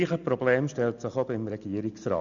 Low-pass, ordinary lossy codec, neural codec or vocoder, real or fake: 7.2 kHz; none; none; real